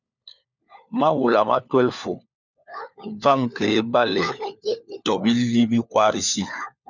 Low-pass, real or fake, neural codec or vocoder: 7.2 kHz; fake; codec, 16 kHz, 4 kbps, FunCodec, trained on LibriTTS, 50 frames a second